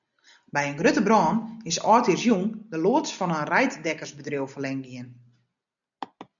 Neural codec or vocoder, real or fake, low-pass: none; real; 7.2 kHz